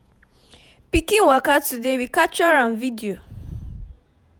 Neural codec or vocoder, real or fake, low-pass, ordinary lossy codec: vocoder, 48 kHz, 128 mel bands, Vocos; fake; none; none